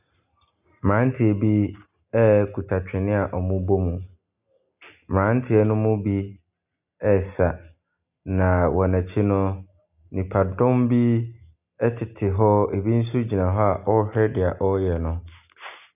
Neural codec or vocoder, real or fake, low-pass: none; real; 3.6 kHz